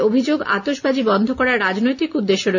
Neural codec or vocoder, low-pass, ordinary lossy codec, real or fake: none; 7.2 kHz; none; real